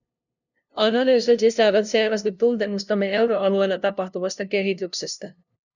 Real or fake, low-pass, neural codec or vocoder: fake; 7.2 kHz; codec, 16 kHz, 0.5 kbps, FunCodec, trained on LibriTTS, 25 frames a second